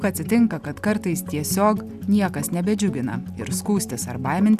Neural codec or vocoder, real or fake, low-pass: none; real; 14.4 kHz